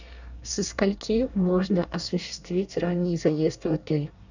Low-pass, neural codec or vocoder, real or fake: 7.2 kHz; codec, 24 kHz, 1 kbps, SNAC; fake